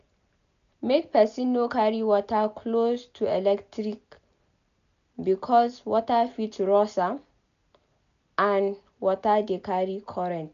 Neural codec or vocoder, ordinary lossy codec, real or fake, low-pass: none; none; real; 7.2 kHz